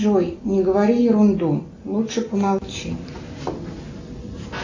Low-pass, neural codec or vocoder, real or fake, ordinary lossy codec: 7.2 kHz; none; real; AAC, 48 kbps